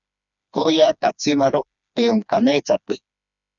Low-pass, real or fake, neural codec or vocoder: 7.2 kHz; fake; codec, 16 kHz, 2 kbps, FreqCodec, smaller model